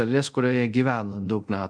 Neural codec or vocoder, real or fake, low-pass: codec, 24 kHz, 0.5 kbps, DualCodec; fake; 9.9 kHz